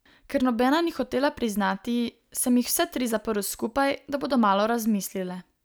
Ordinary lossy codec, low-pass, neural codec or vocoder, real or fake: none; none; none; real